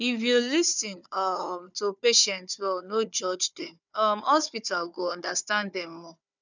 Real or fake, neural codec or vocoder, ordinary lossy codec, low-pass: fake; codec, 16 kHz, 4 kbps, FunCodec, trained on Chinese and English, 50 frames a second; none; 7.2 kHz